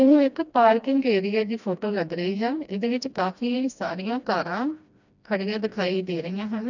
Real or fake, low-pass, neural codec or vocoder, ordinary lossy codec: fake; 7.2 kHz; codec, 16 kHz, 1 kbps, FreqCodec, smaller model; none